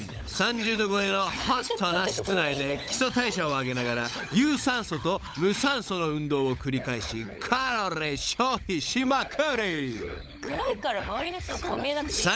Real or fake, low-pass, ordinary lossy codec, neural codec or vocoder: fake; none; none; codec, 16 kHz, 16 kbps, FunCodec, trained on LibriTTS, 50 frames a second